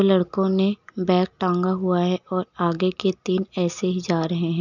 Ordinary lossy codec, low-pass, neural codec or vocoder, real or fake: none; 7.2 kHz; none; real